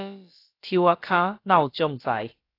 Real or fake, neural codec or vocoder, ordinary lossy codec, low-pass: fake; codec, 16 kHz, about 1 kbps, DyCAST, with the encoder's durations; AAC, 32 kbps; 5.4 kHz